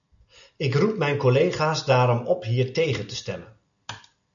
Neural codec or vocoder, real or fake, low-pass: none; real; 7.2 kHz